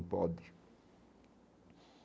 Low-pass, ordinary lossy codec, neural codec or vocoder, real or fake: none; none; none; real